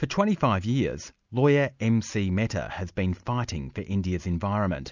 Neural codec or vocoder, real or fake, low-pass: none; real; 7.2 kHz